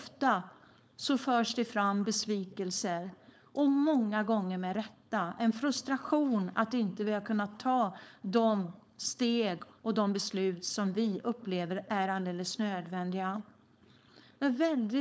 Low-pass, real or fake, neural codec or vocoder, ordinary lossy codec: none; fake; codec, 16 kHz, 4.8 kbps, FACodec; none